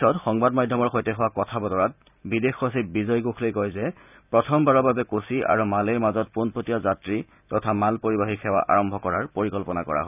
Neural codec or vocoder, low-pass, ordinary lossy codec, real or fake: none; 3.6 kHz; none; real